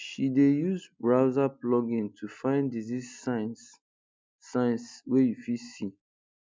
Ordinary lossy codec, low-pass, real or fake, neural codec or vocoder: none; none; real; none